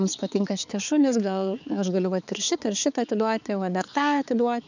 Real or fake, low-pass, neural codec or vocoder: fake; 7.2 kHz; codec, 16 kHz, 4 kbps, X-Codec, HuBERT features, trained on balanced general audio